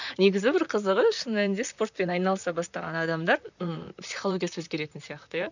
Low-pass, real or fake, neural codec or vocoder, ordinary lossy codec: 7.2 kHz; fake; vocoder, 44.1 kHz, 128 mel bands, Pupu-Vocoder; none